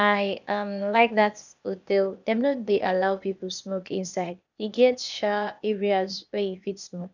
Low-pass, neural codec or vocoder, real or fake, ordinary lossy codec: 7.2 kHz; codec, 16 kHz, 0.8 kbps, ZipCodec; fake; none